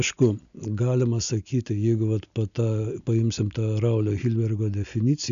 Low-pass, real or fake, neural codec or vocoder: 7.2 kHz; real; none